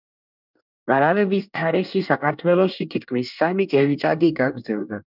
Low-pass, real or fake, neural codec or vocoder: 5.4 kHz; fake; codec, 24 kHz, 1 kbps, SNAC